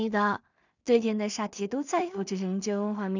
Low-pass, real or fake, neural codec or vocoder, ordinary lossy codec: 7.2 kHz; fake; codec, 16 kHz in and 24 kHz out, 0.4 kbps, LongCat-Audio-Codec, two codebook decoder; none